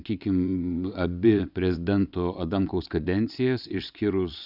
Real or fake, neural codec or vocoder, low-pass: fake; vocoder, 22.05 kHz, 80 mel bands, WaveNeXt; 5.4 kHz